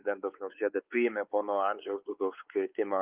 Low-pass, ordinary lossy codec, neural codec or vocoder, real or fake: 3.6 kHz; Opus, 24 kbps; codec, 16 kHz, 4 kbps, X-Codec, WavLM features, trained on Multilingual LibriSpeech; fake